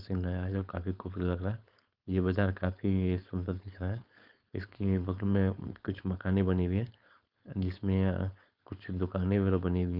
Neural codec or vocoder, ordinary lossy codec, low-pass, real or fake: codec, 16 kHz, 4.8 kbps, FACodec; Opus, 32 kbps; 5.4 kHz; fake